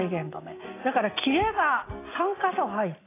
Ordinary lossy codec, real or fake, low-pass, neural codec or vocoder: AAC, 16 kbps; real; 3.6 kHz; none